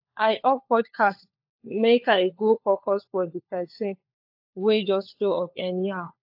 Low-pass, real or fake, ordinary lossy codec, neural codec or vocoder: 5.4 kHz; fake; AAC, 48 kbps; codec, 16 kHz, 4 kbps, FunCodec, trained on LibriTTS, 50 frames a second